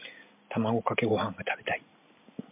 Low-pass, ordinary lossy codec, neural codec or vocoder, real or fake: 3.6 kHz; MP3, 32 kbps; none; real